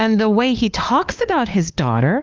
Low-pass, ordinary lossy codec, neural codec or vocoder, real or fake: 7.2 kHz; Opus, 32 kbps; codec, 16 kHz, 2 kbps, FunCodec, trained on LibriTTS, 25 frames a second; fake